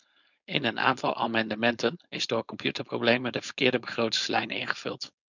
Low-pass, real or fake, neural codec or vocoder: 7.2 kHz; fake; codec, 16 kHz, 4.8 kbps, FACodec